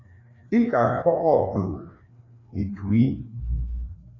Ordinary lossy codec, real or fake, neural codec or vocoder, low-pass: AAC, 32 kbps; fake; codec, 16 kHz, 2 kbps, FreqCodec, larger model; 7.2 kHz